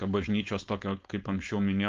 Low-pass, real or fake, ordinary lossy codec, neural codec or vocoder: 7.2 kHz; fake; Opus, 32 kbps; codec, 16 kHz, 4.8 kbps, FACodec